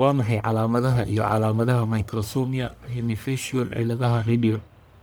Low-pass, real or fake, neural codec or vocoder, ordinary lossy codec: none; fake; codec, 44.1 kHz, 1.7 kbps, Pupu-Codec; none